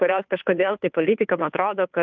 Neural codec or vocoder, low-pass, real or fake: codec, 16 kHz, 2 kbps, FunCodec, trained on Chinese and English, 25 frames a second; 7.2 kHz; fake